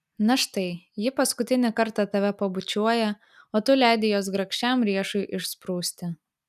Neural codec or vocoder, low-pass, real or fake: none; 14.4 kHz; real